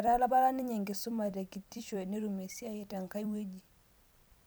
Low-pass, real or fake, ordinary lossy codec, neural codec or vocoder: none; fake; none; vocoder, 44.1 kHz, 128 mel bands every 256 samples, BigVGAN v2